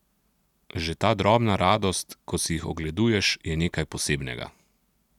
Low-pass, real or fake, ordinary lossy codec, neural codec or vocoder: 19.8 kHz; real; none; none